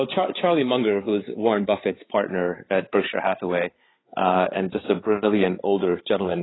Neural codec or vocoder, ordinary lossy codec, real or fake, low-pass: codec, 16 kHz, 16 kbps, FreqCodec, larger model; AAC, 16 kbps; fake; 7.2 kHz